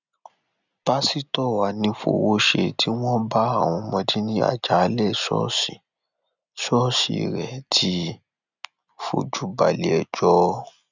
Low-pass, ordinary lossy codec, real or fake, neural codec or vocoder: 7.2 kHz; none; real; none